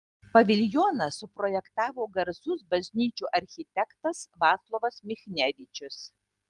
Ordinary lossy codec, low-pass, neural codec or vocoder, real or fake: Opus, 24 kbps; 10.8 kHz; none; real